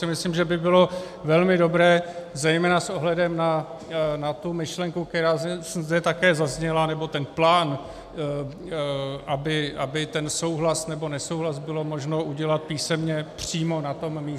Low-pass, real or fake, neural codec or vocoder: 14.4 kHz; real; none